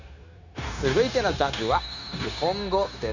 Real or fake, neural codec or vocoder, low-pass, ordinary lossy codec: fake; codec, 16 kHz, 0.9 kbps, LongCat-Audio-Codec; 7.2 kHz; none